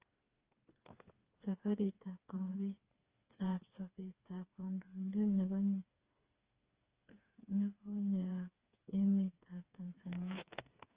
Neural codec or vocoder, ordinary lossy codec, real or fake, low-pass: codec, 16 kHz, 4 kbps, FreqCodec, smaller model; Opus, 24 kbps; fake; 3.6 kHz